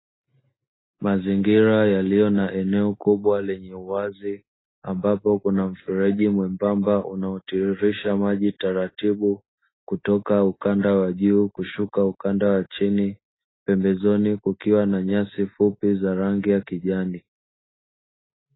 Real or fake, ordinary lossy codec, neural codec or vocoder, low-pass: real; AAC, 16 kbps; none; 7.2 kHz